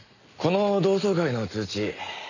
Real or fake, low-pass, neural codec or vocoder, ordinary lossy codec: real; 7.2 kHz; none; none